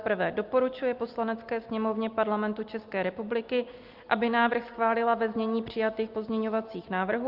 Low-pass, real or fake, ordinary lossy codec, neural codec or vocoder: 5.4 kHz; real; Opus, 24 kbps; none